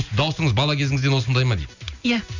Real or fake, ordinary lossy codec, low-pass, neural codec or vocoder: real; none; 7.2 kHz; none